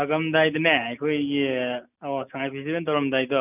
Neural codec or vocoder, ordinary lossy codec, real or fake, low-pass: none; none; real; 3.6 kHz